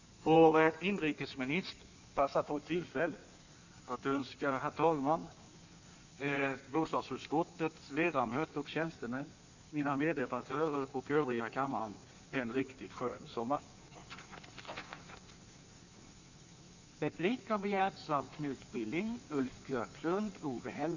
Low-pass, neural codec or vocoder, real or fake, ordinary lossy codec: 7.2 kHz; codec, 16 kHz in and 24 kHz out, 1.1 kbps, FireRedTTS-2 codec; fake; none